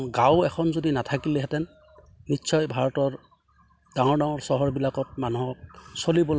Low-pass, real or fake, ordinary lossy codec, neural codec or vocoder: none; real; none; none